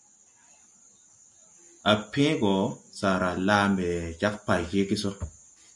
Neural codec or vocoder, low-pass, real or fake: none; 10.8 kHz; real